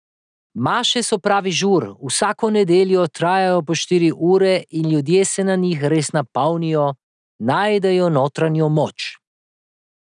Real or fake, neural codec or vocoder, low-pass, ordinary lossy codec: real; none; 9.9 kHz; none